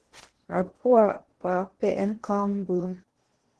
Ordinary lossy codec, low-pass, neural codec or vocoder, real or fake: Opus, 16 kbps; 10.8 kHz; codec, 16 kHz in and 24 kHz out, 0.8 kbps, FocalCodec, streaming, 65536 codes; fake